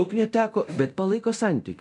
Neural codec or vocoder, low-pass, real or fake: codec, 24 kHz, 0.9 kbps, DualCodec; 10.8 kHz; fake